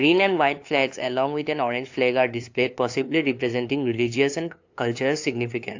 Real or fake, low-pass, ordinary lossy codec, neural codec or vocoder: fake; 7.2 kHz; AAC, 48 kbps; codec, 16 kHz, 2 kbps, FunCodec, trained on LibriTTS, 25 frames a second